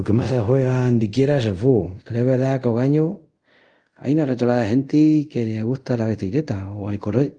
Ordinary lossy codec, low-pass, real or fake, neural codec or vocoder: Opus, 24 kbps; 9.9 kHz; fake; codec, 24 kHz, 0.5 kbps, DualCodec